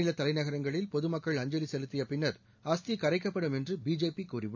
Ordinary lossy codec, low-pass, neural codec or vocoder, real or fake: none; 7.2 kHz; none; real